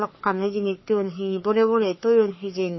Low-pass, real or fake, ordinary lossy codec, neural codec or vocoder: 7.2 kHz; fake; MP3, 24 kbps; codec, 44.1 kHz, 3.4 kbps, Pupu-Codec